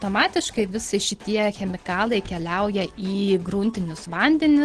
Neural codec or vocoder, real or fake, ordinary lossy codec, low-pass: none; real; Opus, 16 kbps; 9.9 kHz